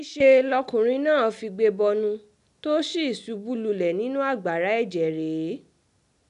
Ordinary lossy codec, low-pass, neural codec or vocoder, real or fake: none; 10.8 kHz; none; real